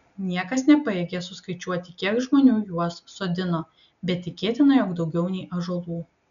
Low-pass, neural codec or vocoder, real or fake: 7.2 kHz; none; real